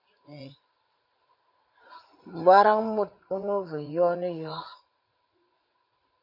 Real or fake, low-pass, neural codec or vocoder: fake; 5.4 kHz; vocoder, 44.1 kHz, 80 mel bands, Vocos